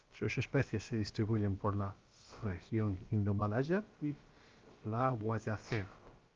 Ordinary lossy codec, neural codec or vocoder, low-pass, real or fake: Opus, 32 kbps; codec, 16 kHz, about 1 kbps, DyCAST, with the encoder's durations; 7.2 kHz; fake